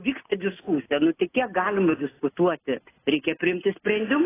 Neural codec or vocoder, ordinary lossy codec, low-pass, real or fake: none; AAC, 16 kbps; 3.6 kHz; real